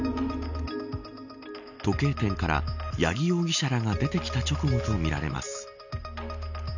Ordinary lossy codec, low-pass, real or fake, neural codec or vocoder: none; 7.2 kHz; real; none